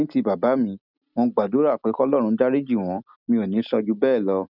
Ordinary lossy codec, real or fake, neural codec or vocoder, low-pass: none; real; none; 5.4 kHz